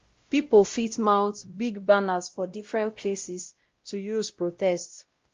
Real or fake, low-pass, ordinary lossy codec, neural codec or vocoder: fake; 7.2 kHz; Opus, 32 kbps; codec, 16 kHz, 0.5 kbps, X-Codec, WavLM features, trained on Multilingual LibriSpeech